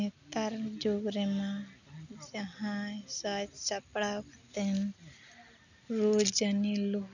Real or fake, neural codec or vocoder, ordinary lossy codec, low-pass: real; none; none; 7.2 kHz